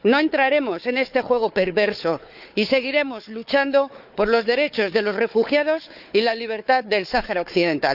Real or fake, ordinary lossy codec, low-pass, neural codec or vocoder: fake; none; 5.4 kHz; codec, 16 kHz, 4 kbps, FunCodec, trained on Chinese and English, 50 frames a second